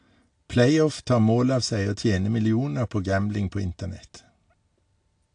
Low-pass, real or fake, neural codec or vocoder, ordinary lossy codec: 9.9 kHz; real; none; AAC, 48 kbps